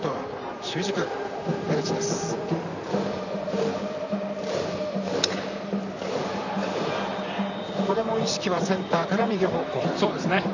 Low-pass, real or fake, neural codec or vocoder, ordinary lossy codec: 7.2 kHz; fake; vocoder, 44.1 kHz, 128 mel bands, Pupu-Vocoder; none